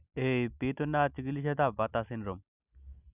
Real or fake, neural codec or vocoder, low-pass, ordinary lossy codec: real; none; 3.6 kHz; none